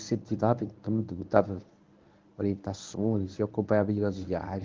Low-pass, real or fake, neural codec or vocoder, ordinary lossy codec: 7.2 kHz; fake; codec, 24 kHz, 0.9 kbps, WavTokenizer, medium speech release version 1; Opus, 32 kbps